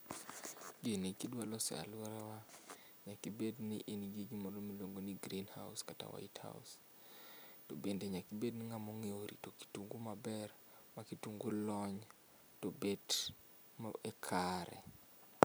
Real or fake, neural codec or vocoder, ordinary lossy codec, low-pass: real; none; none; none